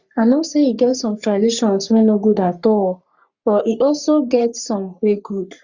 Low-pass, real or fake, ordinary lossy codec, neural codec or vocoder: 7.2 kHz; fake; Opus, 64 kbps; codec, 44.1 kHz, 3.4 kbps, Pupu-Codec